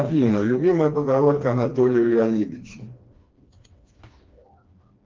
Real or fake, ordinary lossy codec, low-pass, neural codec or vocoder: fake; Opus, 32 kbps; 7.2 kHz; codec, 16 kHz, 2 kbps, FreqCodec, smaller model